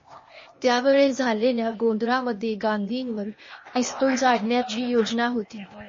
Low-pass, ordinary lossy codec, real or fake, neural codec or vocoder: 7.2 kHz; MP3, 32 kbps; fake; codec, 16 kHz, 0.8 kbps, ZipCodec